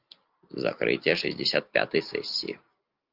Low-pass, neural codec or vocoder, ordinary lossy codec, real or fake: 5.4 kHz; none; Opus, 24 kbps; real